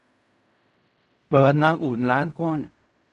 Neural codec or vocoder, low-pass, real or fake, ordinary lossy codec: codec, 16 kHz in and 24 kHz out, 0.4 kbps, LongCat-Audio-Codec, fine tuned four codebook decoder; 10.8 kHz; fake; none